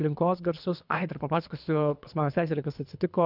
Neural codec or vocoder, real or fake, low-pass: codec, 24 kHz, 3 kbps, HILCodec; fake; 5.4 kHz